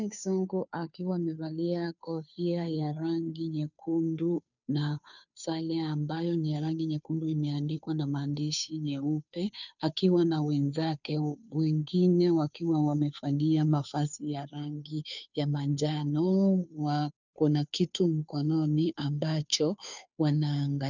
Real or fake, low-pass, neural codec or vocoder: fake; 7.2 kHz; codec, 16 kHz, 2 kbps, FunCodec, trained on Chinese and English, 25 frames a second